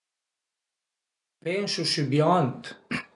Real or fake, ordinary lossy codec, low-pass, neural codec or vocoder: real; none; 10.8 kHz; none